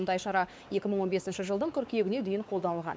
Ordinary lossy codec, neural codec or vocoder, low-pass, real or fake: none; codec, 16 kHz, 4 kbps, X-Codec, WavLM features, trained on Multilingual LibriSpeech; none; fake